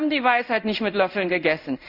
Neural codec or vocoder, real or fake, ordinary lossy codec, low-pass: codec, 16 kHz in and 24 kHz out, 1 kbps, XY-Tokenizer; fake; Opus, 64 kbps; 5.4 kHz